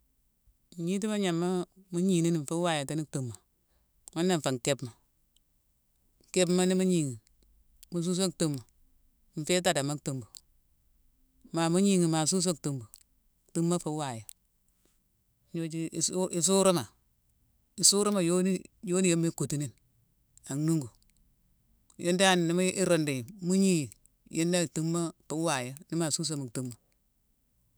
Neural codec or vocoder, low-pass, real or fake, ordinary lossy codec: autoencoder, 48 kHz, 128 numbers a frame, DAC-VAE, trained on Japanese speech; none; fake; none